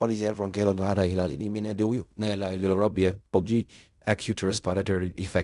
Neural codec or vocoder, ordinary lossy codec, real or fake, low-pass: codec, 16 kHz in and 24 kHz out, 0.4 kbps, LongCat-Audio-Codec, fine tuned four codebook decoder; none; fake; 10.8 kHz